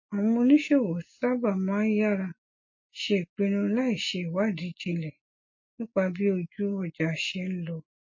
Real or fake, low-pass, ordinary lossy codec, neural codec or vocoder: real; 7.2 kHz; MP3, 32 kbps; none